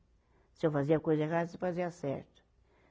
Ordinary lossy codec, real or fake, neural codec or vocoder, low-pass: none; real; none; none